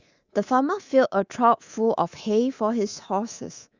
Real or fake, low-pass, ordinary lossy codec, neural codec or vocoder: fake; 7.2 kHz; Opus, 64 kbps; codec, 24 kHz, 3.1 kbps, DualCodec